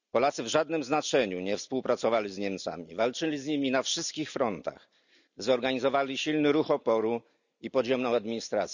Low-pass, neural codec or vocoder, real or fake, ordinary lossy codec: 7.2 kHz; none; real; none